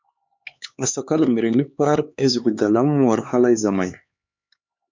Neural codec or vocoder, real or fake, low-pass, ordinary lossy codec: codec, 16 kHz, 4 kbps, X-Codec, HuBERT features, trained on LibriSpeech; fake; 7.2 kHz; MP3, 64 kbps